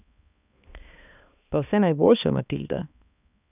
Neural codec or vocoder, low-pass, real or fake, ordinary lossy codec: codec, 16 kHz, 2 kbps, X-Codec, HuBERT features, trained on balanced general audio; 3.6 kHz; fake; none